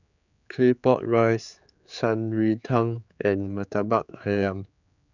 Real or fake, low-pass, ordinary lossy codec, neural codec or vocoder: fake; 7.2 kHz; none; codec, 16 kHz, 4 kbps, X-Codec, HuBERT features, trained on general audio